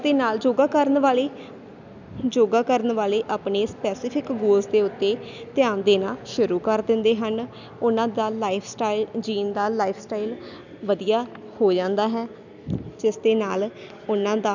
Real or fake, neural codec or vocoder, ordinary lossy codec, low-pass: real; none; none; 7.2 kHz